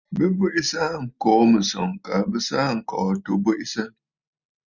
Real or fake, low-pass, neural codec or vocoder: real; 7.2 kHz; none